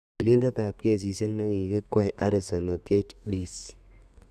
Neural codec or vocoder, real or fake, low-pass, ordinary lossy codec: codec, 32 kHz, 1.9 kbps, SNAC; fake; 14.4 kHz; none